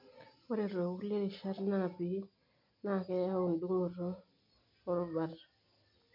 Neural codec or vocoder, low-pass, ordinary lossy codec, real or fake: none; 5.4 kHz; MP3, 48 kbps; real